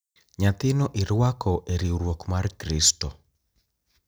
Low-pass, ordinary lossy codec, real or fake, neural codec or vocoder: none; none; real; none